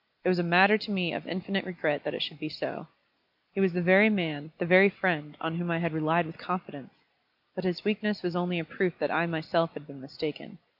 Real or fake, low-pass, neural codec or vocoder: real; 5.4 kHz; none